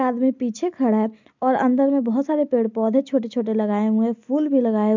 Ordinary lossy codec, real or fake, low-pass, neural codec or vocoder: MP3, 64 kbps; real; 7.2 kHz; none